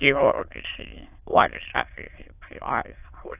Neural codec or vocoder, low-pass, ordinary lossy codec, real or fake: autoencoder, 22.05 kHz, a latent of 192 numbers a frame, VITS, trained on many speakers; 3.6 kHz; AAC, 32 kbps; fake